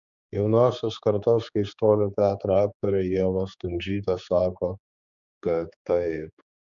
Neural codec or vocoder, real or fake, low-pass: codec, 16 kHz, 4 kbps, X-Codec, HuBERT features, trained on general audio; fake; 7.2 kHz